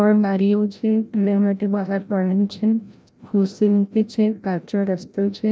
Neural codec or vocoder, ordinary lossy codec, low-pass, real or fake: codec, 16 kHz, 0.5 kbps, FreqCodec, larger model; none; none; fake